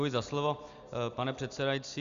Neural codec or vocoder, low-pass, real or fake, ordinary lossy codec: none; 7.2 kHz; real; Opus, 64 kbps